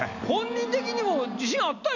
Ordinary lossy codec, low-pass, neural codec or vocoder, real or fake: none; 7.2 kHz; none; real